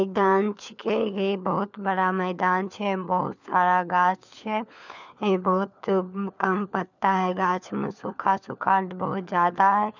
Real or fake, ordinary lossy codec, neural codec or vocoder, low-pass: fake; none; codec, 16 kHz, 4 kbps, FunCodec, trained on LibriTTS, 50 frames a second; 7.2 kHz